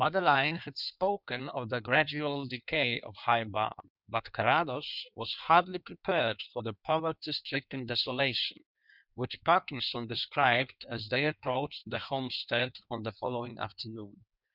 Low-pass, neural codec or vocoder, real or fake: 5.4 kHz; codec, 16 kHz in and 24 kHz out, 1.1 kbps, FireRedTTS-2 codec; fake